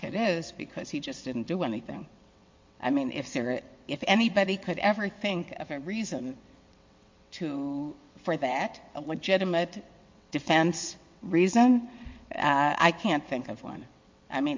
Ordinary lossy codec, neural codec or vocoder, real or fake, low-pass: MP3, 48 kbps; vocoder, 22.05 kHz, 80 mel bands, WaveNeXt; fake; 7.2 kHz